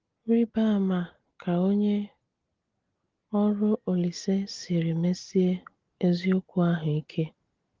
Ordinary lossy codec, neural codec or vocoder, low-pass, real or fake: Opus, 16 kbps; none; 7.2 kHz; real